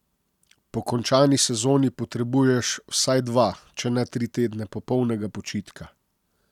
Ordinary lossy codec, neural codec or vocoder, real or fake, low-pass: none; none; real; 19.8 kHz